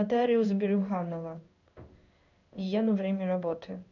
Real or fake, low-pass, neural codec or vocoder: fake; 7.2 kHz; codec, 16 kHz in and 24 kHz out, 1 kbps, XY-Tokenizer